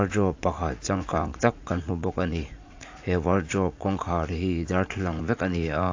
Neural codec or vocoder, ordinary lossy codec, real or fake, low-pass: vocoder, 44.1 kHz, 80 mel bands, Vocos; AAC, 48 kbps; fake; 7.2 kHz